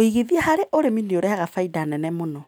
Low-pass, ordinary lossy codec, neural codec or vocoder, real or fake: none; none; none; real